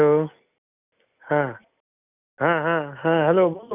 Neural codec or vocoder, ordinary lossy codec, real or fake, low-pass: none; none; real; 3.6 kHz